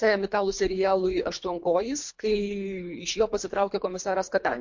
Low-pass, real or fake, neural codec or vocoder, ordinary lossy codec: 7.2 kHz; fake; codec, 24 kHz, 3 kbps, HILCodec; MP3, 48 kbps